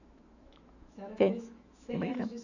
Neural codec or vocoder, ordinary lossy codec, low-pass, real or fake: autoencoder, 48 kHz, 128 numbers a frame, DAC-VAE, trained on Japanese speech; none; 7.2 kHz; fake